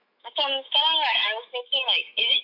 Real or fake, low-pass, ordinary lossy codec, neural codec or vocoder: fake; 5.4 kHz; none; autoencoder, 48 kHz, 128 numbers a frame, DAC-VAE, trained on Japanese speech